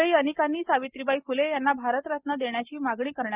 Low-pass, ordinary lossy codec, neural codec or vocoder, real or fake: 3.6 kHz; Opus, 32 kbps; none; real